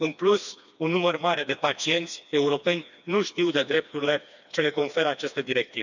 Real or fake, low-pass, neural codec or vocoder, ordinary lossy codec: fake; 7.2 kHz; codec, 16 kHz, 2 kbps, FreqCodec, smaller model; none